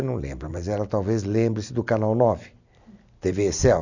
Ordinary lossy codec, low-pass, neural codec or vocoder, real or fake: none; 7.2 kHz; none; real